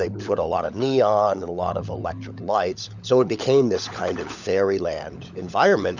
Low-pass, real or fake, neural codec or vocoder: 7.2 kHz; fake; codec, 16 kHz, 16 kbps, FunCodec, trained on LibriTTS, 50 frames a second